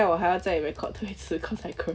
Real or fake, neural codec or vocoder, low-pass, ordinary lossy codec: real; none; none; none